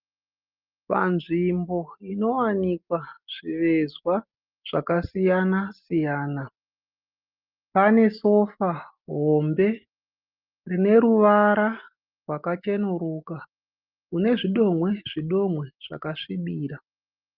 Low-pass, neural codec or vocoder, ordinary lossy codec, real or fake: 5.4 kHz; none; Opus, 24 kbps; real